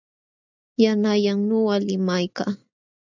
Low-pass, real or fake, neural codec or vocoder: 7.2 kHz; real; none